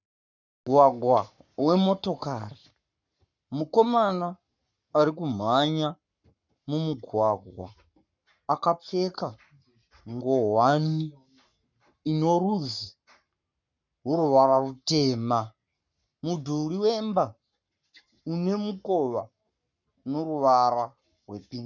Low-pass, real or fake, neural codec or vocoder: 7.2 kHz; fake; codec, 44.1 kHz, 7.8 kbps, Pupu-Codec